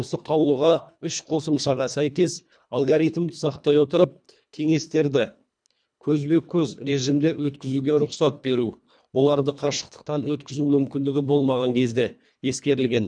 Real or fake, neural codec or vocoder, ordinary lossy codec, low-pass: fake; codec, 24 kHz, 1.5 kbps, HILCodec; none; 9.9 kHz